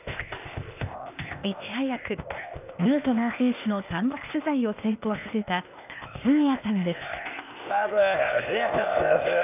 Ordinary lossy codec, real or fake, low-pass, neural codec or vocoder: none; fake; 3.6 kHz; codec, 16 kHz, 0.8 kbps, ZipCodec